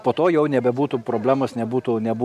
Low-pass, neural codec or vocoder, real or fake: 14.4 kHz; none; real